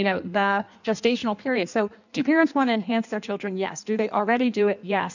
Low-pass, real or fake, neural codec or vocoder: 7.2 kHz; fake; codec, 16 kHz in and 24 kHz out, 1.1 kbps, FireRedTTS-2 codec